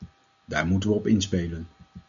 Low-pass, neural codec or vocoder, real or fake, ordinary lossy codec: 7.2 kHz; none; real; MP3, 96 kbps